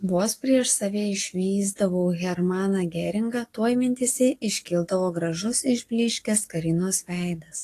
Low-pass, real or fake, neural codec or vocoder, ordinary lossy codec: 14.4 kHz; fake; codec, 44.1 kHz, 7.8 kbps, DAC; AAC, 48 kbps